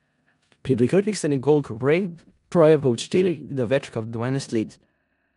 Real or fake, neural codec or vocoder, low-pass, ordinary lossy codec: fake; codec, 16 kHz in and 24 kHz out, 0.4 kbps, LongCat-Audio-Codec, four codebook decoder; 10.8 kHz; none